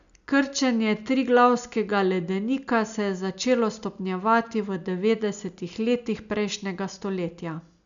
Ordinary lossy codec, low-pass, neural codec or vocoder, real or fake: none; 7.2 kHz; none; real